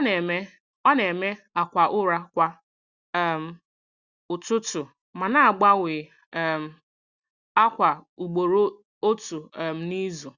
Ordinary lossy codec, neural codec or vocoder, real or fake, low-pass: Opus, 64 kbps; none; real; 7.2 kHz